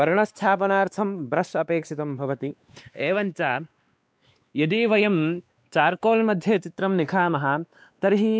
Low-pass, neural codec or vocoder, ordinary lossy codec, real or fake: none; codec, 16 kHz, 2 kbps, X-Codec, WavLM features, trained on Multilingual LibriSpeech; none; fake